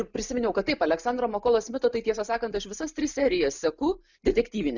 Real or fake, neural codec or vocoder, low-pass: real; none; 7.2 kHz